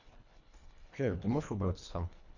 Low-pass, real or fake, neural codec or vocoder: 7.2 kHz; fake; codec, 24 kHz, 1.5 kbps, HILCodec